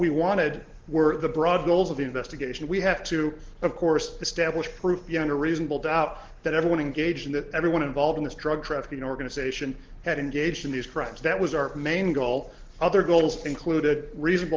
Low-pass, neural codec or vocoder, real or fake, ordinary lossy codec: 7.2 kHz; none; real; Opus, 16 kbps